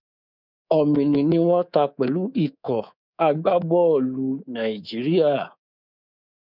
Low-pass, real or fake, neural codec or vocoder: 5.4 kHz; fake; codec, 24 kHz, 3.1 kbps, DualCodec